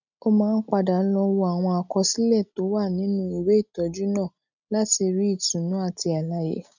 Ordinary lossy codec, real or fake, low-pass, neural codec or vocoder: none; real; 7.2 kHz; none